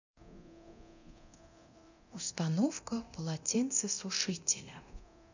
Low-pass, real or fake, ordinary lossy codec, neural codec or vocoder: 7.2 kHz; fake; none; codec, 24 kHz, 0.9 kbps, DualCodec